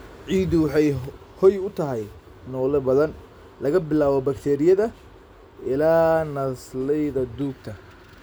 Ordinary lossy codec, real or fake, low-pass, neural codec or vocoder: none; real; none; none